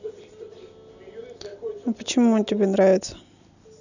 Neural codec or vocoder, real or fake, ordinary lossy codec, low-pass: none; real; none; 7.2 kHz